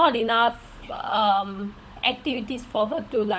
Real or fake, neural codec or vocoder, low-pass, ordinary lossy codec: fake; codec, 16 kHz, 16 kbps, FunCodec, trained on LibriTTS, 50 frames a second; none; none